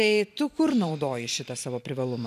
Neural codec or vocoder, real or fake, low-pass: vocoder, 44.1 kHz, 128 mel bands every 512 samples, BigVGAN v2; fake; 14.4 kHz